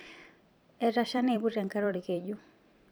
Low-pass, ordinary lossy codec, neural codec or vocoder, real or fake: none; none; vocoder, 44.1 kHz, 128 mel bands every 256 samples, BigVGAN v2; fake